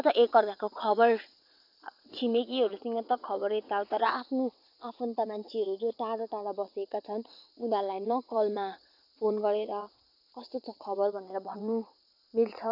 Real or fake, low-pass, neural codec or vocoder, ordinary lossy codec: fake; 5.4 kHz; vocoder, 22.05 kHz, 80 mel bands, WaveNeXt; none